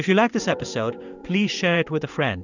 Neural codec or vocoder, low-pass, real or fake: codec, 16 kHz in and 24 kHz out, 1 kbps, XY-Tokenizer; 7.2 kHz; fake